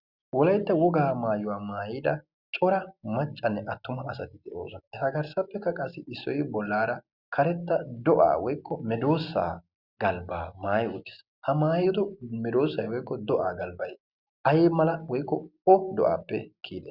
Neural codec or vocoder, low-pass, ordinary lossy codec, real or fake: none; 5.4 kHz; Opus, 64 kbps; real